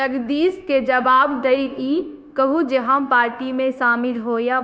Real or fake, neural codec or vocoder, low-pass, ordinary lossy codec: fake; codec, 16 kHz, 0.9 kbps, LongCat-Audio-Codec; none; none